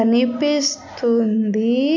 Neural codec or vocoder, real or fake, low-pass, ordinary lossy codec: none; real; 7.2 kHz; MP3, 64 kbps